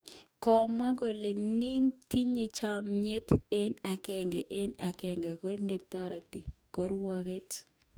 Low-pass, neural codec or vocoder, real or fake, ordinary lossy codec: none; codec, 44.1 kHz, 2.6 kbps, DAC; fake; none